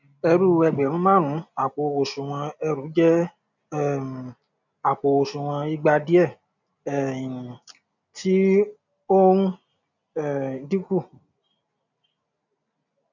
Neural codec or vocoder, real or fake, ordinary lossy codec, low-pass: none; real; none; 7.2 kHz